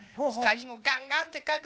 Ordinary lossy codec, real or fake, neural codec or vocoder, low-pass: none; fake; codec, 16 kHz, 0.8 kbps, ZipCodec; none